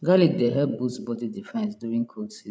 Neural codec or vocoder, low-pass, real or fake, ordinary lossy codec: codec, 16 kHz, 16 kbps, FreqCodec, larger model; none; fake; none